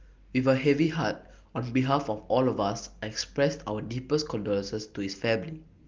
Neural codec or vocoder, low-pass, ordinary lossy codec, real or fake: none; 7.2 kHz; Opus, 32 kbps; real